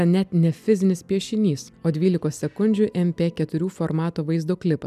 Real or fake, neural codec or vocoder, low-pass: real; none; 14.4 kHz